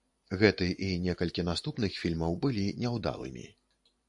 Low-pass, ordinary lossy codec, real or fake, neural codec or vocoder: 10.8 kHz; AAC, 64 kbps; fake; vocoder, 44.1 kHz, 128 mel bands every 512 samples, BigVGAN v2